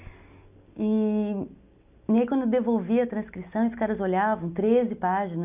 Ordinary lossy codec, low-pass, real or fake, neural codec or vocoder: none; 3.6 kHz; real; none